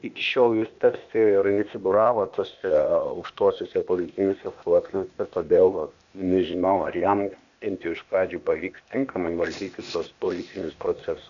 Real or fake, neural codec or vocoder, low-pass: fake; codec, 16 kHz, 0.8 kbps, ZipCodec; 7.2 kHz